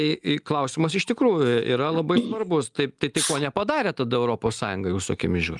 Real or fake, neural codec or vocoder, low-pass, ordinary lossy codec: real; none; 10.8 kHz; Opus, 32 kbps